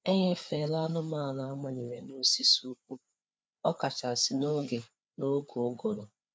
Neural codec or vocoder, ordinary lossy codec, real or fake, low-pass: codec, 16 kHz, 4 kbps, FreqCodec, larger model; none; fake; none